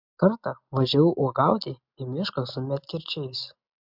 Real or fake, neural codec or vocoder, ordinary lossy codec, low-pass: real; none; MP3, 48 kbps; 5.4 kHz